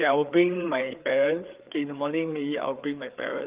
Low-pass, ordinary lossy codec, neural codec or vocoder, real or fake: 3.6 kHz; Opus, 32 kbps; codec, 16 kHz, 4 kbps, FreqCodec, larger model; fake